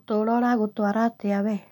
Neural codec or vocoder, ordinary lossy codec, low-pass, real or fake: none; none; 19.8 kHz; real